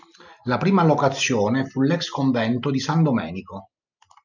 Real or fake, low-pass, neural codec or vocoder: real; 7.2 kHz; none